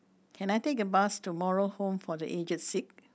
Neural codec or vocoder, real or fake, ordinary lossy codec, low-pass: none; real; none; none